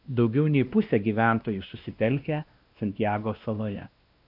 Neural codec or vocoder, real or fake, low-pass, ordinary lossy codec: codec, 16 kHz, 1 kbps, X-Codec, WavLM features, trained on Multilingual LibriSpeech; fake; 5.4 kHz; AAC, 48 kbps